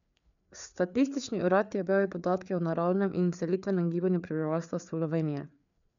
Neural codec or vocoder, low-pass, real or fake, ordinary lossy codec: codec, 16 kHz, 4 kbps, FreqCodec, larger model; 7.2 kHz; fake; none